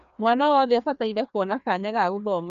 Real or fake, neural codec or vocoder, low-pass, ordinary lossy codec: fake; codec, 16 kHz, 2 kbps, FreqCodec, larger model; 7.2 kHz; none